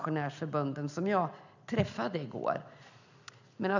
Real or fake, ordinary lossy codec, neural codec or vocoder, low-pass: real; none; none; 7.2 kHz